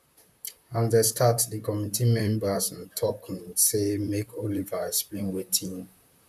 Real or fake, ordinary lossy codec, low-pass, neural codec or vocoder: fake; none; 14.4 kHz; vocoder, 44.1 kHz, 128 mel bands, Pupu-Vocoder